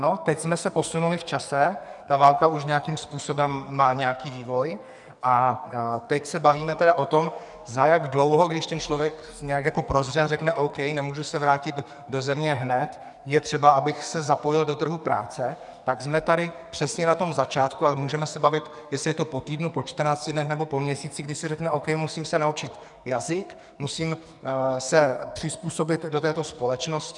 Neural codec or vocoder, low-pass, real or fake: codec, 44.1 kHz, 2.6 kbps, SNAC; 10.8 kHz; fake